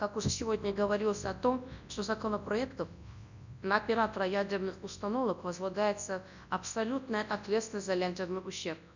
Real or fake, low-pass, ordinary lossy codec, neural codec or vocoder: fake; 7.2 kHz; none; codec, 24 kHz, 0.9 kbps, WavTokenizer, large speech release